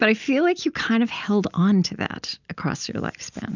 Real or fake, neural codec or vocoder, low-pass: real; none; 7.2 kHz